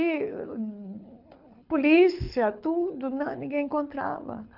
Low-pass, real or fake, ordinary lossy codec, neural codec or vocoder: 5.4 kHz; fake; none; codec, 24 kHz, 6 kbps, HILCodec